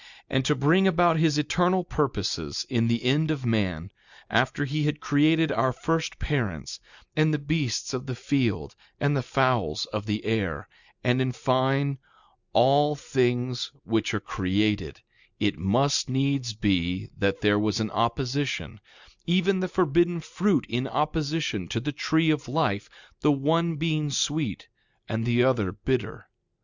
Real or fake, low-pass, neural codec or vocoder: real; 7.2 kHz; none